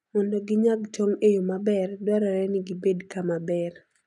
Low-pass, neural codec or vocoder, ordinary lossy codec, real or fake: 10.8 kHz; none; none; real